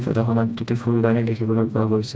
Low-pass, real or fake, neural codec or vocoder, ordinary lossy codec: none; fake; codec, 16 kHz, 1 kbps, FreqCodec, smaller model; none